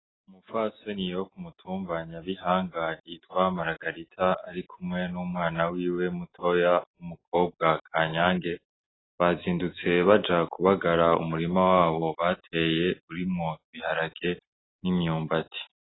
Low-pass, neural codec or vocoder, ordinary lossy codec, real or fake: 7.2 kHz; none; AAC, 16 kbps; real